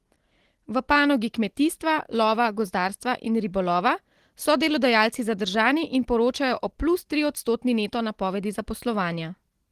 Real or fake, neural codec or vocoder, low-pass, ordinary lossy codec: real; none; 14.4 kHz; Opus, 16 kbps